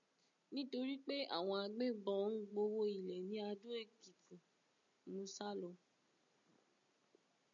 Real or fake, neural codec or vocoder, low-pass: real; none; 7.2 kHz